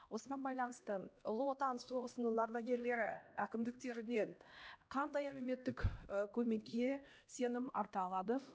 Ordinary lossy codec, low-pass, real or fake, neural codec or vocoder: none; none; fake; codec, 16 kHz, 1 kbps, X-Codec, HuBERT features, trained on LibriSpeech